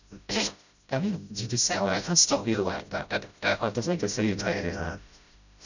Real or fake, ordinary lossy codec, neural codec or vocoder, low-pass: fake; none; codec, 16 kHz, 0.5 kbps, FreqCodec, smaller model; 7.2 kHz